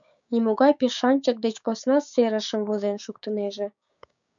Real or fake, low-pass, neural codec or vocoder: fake; 7.2 kHz; codec, 16 kHz, 6 kbps, DAC